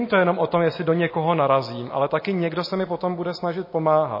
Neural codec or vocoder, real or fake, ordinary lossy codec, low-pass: none; real; MP3, 24 kbps; 5.4 kHz